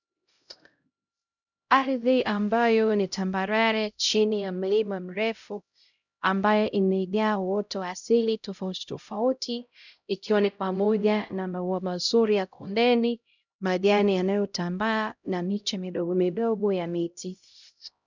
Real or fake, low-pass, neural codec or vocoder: fake; 7.2 kHz; codec, 16 kHz, 0.5 kbps, X-Codec, HuBERT features, trained on LibriSpeech